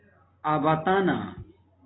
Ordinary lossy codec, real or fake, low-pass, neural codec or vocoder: AAC, 16 kbps; real; 7.2 kHz; none